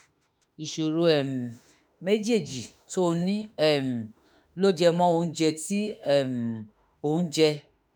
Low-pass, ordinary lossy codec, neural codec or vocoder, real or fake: none; none; autoencoder, 48 kHz, 32 numbers a frame, DAC-VAE, trained on Japanese speech; fake